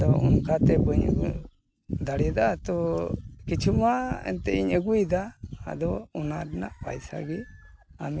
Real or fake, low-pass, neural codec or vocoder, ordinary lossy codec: real; none; none; none